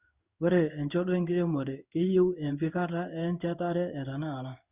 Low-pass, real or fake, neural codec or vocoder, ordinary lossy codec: 3.6 kHz; fake; codec, 16 kHz in and 24 kHz out, 1 kbps, XY-Tokenizer; Opus, 64 kbps